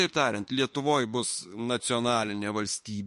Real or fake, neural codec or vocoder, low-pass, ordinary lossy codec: fake; autoencoder, 48 kHz, 32 numbers a frame, DAC-VAE, trained on Japanese speech; 14.4 kHz; MP3, 48 kbps